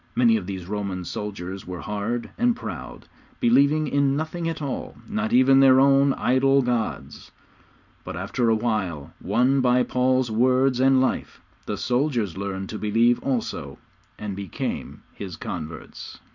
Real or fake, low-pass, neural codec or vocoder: real; 7.2 kHz; none